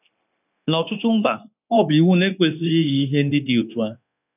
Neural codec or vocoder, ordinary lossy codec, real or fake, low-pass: codec, 16 kHz, 0.9 kbps, LongCat-Audio-Codec; none; fake; 3.6 kHz